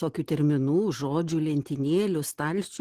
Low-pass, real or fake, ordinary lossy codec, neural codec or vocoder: 14.4 kHz; real; Opus, 16 kbps; none